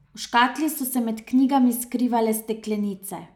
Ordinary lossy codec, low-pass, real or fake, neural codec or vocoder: none; 19.8 kHz; real; none